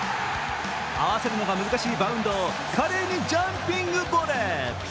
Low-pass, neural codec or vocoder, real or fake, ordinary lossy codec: none; none; real; none